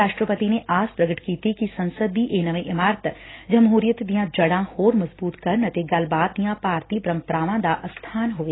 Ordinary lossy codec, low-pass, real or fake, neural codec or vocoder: AAC, 16 kbps; 7.2 kHz; real; none